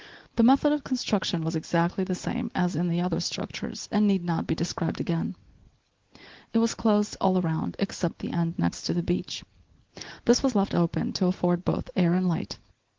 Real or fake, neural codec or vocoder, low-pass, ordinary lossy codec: real; none; 7.2 kHz; Opus, 16 kbps